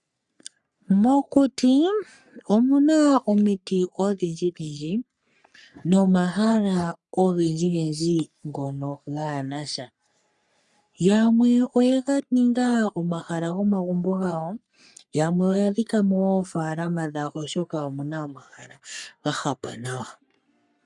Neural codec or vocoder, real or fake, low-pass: codec, 44.1 kHz, 3.4 kbps, Pupu-Codec; fake; 10.8 kHz